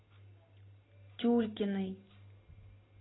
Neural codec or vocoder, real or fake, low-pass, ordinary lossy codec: none; real; 7.2 kHz; AAC, 16 kbps